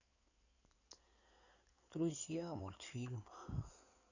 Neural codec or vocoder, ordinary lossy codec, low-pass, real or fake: codec, 16 kHz in and 24 kHz out, 2.2 kbps, FireRedTTS-2 codec; none; 7.2 kHz; fake